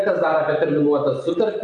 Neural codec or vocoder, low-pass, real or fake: none; 9.9 kHz; real